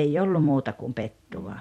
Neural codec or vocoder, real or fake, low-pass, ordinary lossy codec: vocoder, 44.1 kHz, 128 mel bands every 256 samples, BigVGAN v2; fake; 19.8 kHz; MP3, 64 kbps